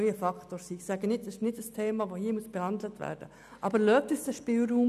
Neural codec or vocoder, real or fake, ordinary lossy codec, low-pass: none; real; none; 14.4 kHz